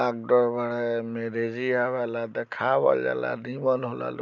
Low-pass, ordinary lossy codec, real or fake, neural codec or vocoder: 7.2 kHz; none; real; none